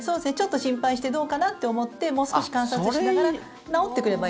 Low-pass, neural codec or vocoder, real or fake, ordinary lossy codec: none; none; real; none